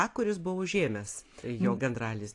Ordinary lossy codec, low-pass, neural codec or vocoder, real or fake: AAC, 48 kbps; 10.8 kHz; none; real